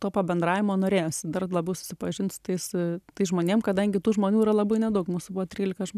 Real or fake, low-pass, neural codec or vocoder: real; 14.4 kHz; none